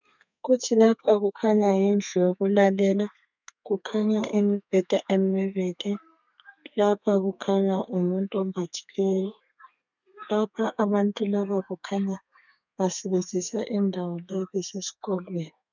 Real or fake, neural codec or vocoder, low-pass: fake; codec, 32 kHz, 1.9 kbps, SNAC; 7.2 kHz